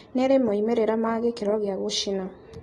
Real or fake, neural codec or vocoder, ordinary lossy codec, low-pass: real; none; AAC, 32 kbps; 19.8 kHz